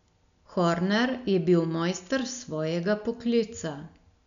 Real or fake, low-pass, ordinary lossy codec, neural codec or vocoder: real; 7.2 kHz; none; none